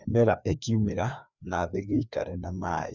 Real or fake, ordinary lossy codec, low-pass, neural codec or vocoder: fake; none; 7.2 kHz; codec, 16 kHz, 2 kbps, FreqCodec, larger model